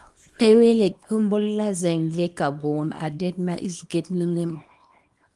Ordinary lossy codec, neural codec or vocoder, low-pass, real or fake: Opus, 32 kbps; codec, 24 kHz, 0.9 kbps, WavTokenizer, small release; 10.8 kHz; fake